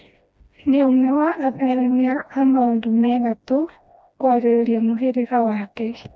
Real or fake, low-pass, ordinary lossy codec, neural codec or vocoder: fake; none; none; codec, 16 kHz, 1 kbps, FreqCodec, smaller model